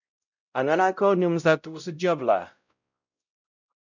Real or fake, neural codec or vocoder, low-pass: fake; codec, 16 kHz, 0.5 kbps, X-Codec, WavLM features, trained on Multilingual LibriSpeech; 7.2 kHz